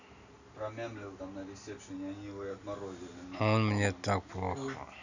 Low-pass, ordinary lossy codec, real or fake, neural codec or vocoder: 7.2 kHz; none; real; none